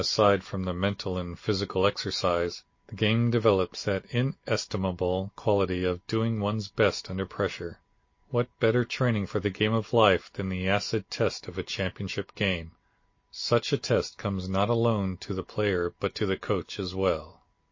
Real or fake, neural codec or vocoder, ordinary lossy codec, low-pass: real; none; MP3, 32 kbps; 7.2 kHz